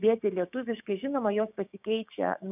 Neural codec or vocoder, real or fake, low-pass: codec, 16 kHz, 6 kbps, DAC; fake; 3.6 kHz